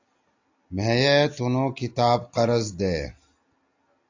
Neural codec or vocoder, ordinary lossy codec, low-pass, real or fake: none; AAC, 48 kbps; 7.2 kHz; real